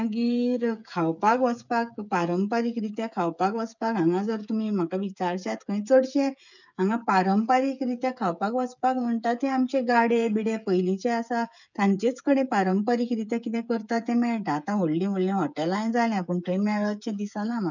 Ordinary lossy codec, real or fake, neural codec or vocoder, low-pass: none; fake; codec, 16 kHz, 16 kbps, FreqCodec, smaller model; 7.2 kHz